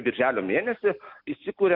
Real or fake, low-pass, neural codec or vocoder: real; 5.4 kHz; none